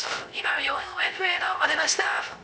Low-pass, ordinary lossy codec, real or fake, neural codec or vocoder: none; none; fake; codec, 16 kHz, 0.3 kbps, FocalCodec